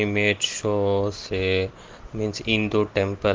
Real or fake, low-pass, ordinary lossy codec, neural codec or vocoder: real; 7.2 kHz; Opus, 16 kbps; none